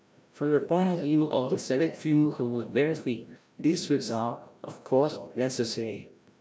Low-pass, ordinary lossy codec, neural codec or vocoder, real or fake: none; none; codec, 16 kHz, 0.5 kbps, FreqCodec, larger model; fake